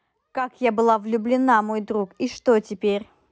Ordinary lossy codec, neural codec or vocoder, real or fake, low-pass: none; none; real; none